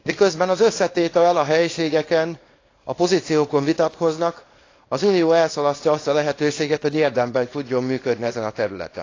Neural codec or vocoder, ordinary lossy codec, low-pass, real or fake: codec, 24 kHz, 0.9 kbps, WavTokenizer, small release; AAC, 32 kbps; 7.2 kHz; fake